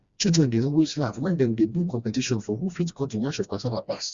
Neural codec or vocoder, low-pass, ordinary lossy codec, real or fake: codec, 16 kHz, 1 kbps, FreqCodec, smaller model; 7.2 kHz; Opus, 64 kbps; fake